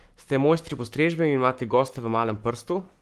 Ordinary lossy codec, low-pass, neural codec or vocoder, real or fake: Opus, 24 kbps; 14.4 kHz; codec, 44.1 kHz, 7.8 kbps, Pupu-Codec; fake